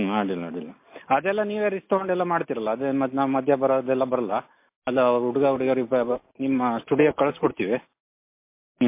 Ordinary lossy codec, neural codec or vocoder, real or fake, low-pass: MP3, 24 kbps; none; real; 3.6 kHz